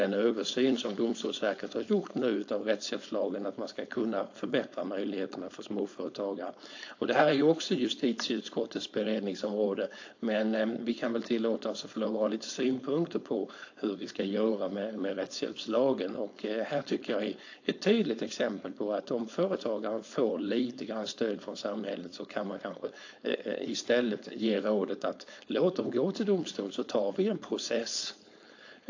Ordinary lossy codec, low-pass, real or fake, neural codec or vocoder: AAC, 48 kbps; 7.2 kHz; fake; codec, 16 kHz, 4.8 kbps, FACodec